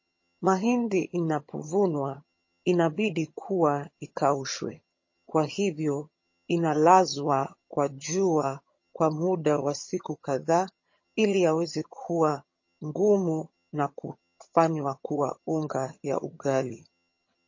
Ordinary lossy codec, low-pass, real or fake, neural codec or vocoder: MP3, 32 kbps; 7.2 kHz; fake; vocoder, 22.05 kHz, 80 mel bands, HiFi-GAN